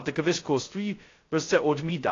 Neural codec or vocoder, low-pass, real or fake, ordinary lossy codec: codec, 16 kHz, 0.2 kbps, FocalCodec; 7.2 kHz; fake; AAC, 32 kbps